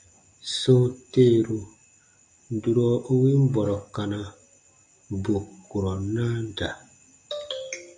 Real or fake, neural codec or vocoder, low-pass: real; none; 9.9 kHz